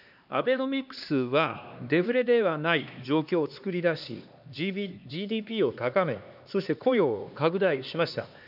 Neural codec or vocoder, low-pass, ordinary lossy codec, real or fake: codec, 16 kHz, 2 kbps, X-Codec, HuBERT features, trained on LibriSpeech; 5.4 kHz; none; fake